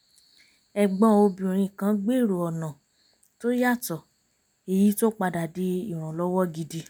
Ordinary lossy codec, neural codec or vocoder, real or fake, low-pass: none; none; real; none